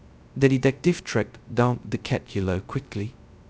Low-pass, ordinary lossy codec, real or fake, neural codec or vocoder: none; none; fake; codec, 16 kHz, 0.2 kbps, FocalCodec